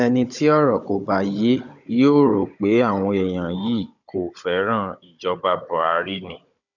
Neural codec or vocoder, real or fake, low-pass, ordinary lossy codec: codec, 16 kHz, 16 kbps, FunCodec, trained on Chinese and English, 50 frames a second; fake; 7.2 kHz; none